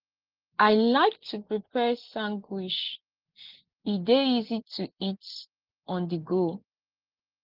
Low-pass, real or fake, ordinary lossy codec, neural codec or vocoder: 5.4 kHz; real; Opus, 16 kbps; none